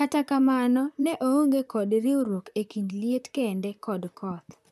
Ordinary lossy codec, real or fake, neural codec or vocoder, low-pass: none; fake; vocoder, 44.1 kHz, 128 mel bands, Pupu-Vocoder; 14.4 kHz